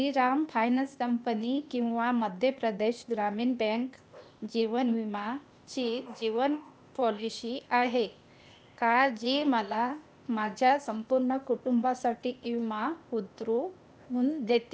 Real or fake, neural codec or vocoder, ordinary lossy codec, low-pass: fake; codec, 16 kHz, 0.8 kbps, ZipCodec; none; none